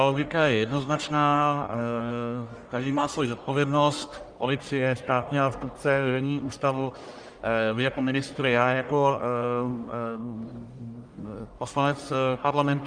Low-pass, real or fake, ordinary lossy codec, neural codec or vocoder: 9.9 kHz; fake; Opus, 32 kbps; codec, 44.1 kHz, 1.7 kbps, Pupu-Codec